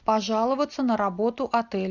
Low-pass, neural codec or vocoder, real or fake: 7.2 kHz; none; real